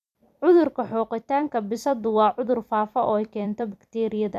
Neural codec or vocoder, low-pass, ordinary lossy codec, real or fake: vocoder, 44.1 kHz, 128 mel bands every 256 samples, BigVGAN v2; 14.4 kHz; none; fake